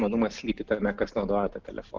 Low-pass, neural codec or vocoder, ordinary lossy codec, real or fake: 7.2 kHz; none; Opus, 24 kbps; real